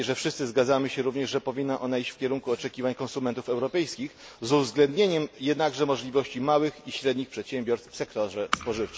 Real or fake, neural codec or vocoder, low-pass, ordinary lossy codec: real; none; none; none